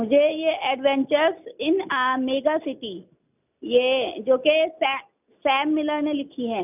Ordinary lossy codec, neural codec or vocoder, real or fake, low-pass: none; none; real; 3.6 kHz